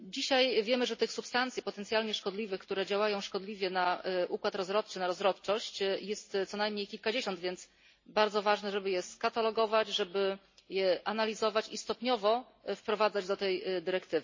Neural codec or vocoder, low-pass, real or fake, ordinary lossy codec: none; 7.2 kHz; real; MP3, 32 kbps